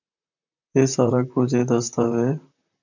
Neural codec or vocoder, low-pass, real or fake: vocoder, 44.1 kHz, 128 mel bands, Pupu-Vocoder; 7.2 kHz; fake